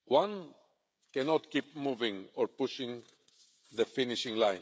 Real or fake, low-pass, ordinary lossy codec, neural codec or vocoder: fake; none; none; codec, 16 kHz, 16 kbps, FreqCodec, smaller model